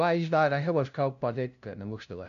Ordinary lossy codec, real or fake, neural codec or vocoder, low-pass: none; fake; codec, 16 kHz, 0.5 kbps, FunCodec, trained on LibriTTS, 25 frames a second; 7.2 kHz